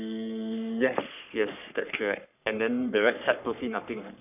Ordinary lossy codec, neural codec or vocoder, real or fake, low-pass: none; codec, 44.1 kHz, 3.4 kbps, Pupu-Codec; fake; 3.6 kHz